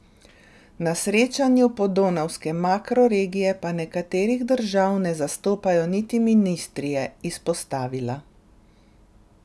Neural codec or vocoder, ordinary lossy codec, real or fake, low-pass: none; none; real; none